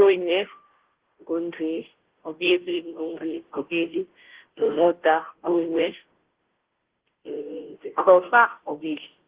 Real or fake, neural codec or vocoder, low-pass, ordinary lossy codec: fake; codec, 16 kHz, 0.5 kbps, FunCodec, trained on Chinese and English, 25 frames a second; 3.6 kHz; Opus, 16 kbps